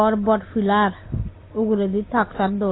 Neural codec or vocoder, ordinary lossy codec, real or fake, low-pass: none; AAC, 16 kbps; real; 7.2 kHz